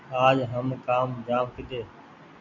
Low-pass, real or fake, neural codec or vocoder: 7.2 kHz; real; none